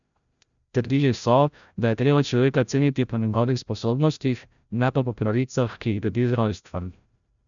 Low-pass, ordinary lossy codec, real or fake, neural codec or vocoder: 7.2 kHz; none; fake; codec, 16 kHz, 0.5 kbps, FreqCodec, larger model